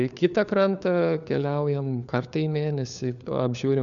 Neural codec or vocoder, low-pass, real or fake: codec, 16 kHz, 4 kbps, FunCodec, trained on LibriTTS, 50 frames a second; 7.2 kHz; fake